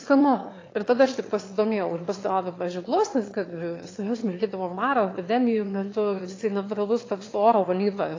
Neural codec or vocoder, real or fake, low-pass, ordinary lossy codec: autoencoder, 22.05 kHz, a latent of 192 numbers a frame, VITS, trained on one speaker; fake; 7.2 kHz; AAC, 32 kbps